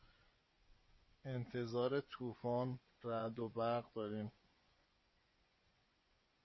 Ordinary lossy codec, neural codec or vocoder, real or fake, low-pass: MP3, 24 kbps; vocoder, 24 kHz, 100 mel bands, Vocos; fake; 7.2 kHz